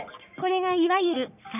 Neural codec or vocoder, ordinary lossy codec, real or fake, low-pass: codec, 44.1 kHz, 3.4 kbps, Pupu-Codec; none; fake; 3.6 kHz